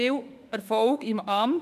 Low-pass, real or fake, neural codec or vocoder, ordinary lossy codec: 14.4 kHz; fake; autoencoder, 48 kHz, 32 numbers a frame, DAC-VAE, trained on Japanese speech; none